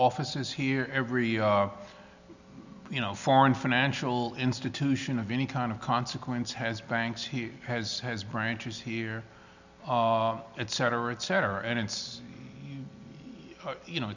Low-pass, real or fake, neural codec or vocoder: 7.2 kHz; real; none